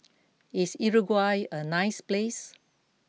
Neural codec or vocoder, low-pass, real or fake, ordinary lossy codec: none; none; real; none